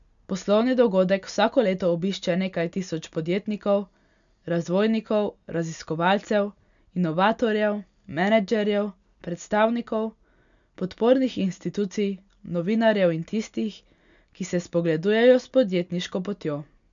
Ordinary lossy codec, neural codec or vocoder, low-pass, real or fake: none; none; 7.2 kHz; real